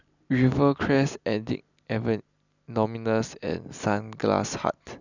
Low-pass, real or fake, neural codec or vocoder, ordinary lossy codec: 7.2 kHz; real; none; none